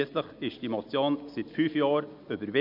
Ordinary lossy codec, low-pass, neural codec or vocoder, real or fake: none; 5.4 kHz; none; real